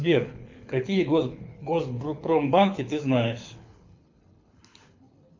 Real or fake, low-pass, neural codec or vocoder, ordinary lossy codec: fake; 7.2 kHz; codec, 24 kHz, 6 kbps, HILCodec; MP3, 64 kbps